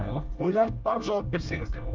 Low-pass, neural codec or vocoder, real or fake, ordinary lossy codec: 7.2 kHz; codec, 24 kHz, 1 kbps, SNAC; fake; Opus, 24 kbps